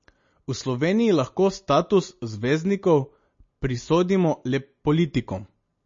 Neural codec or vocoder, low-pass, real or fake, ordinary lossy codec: none; 7.2 kHz; real; MP3, 32 kbps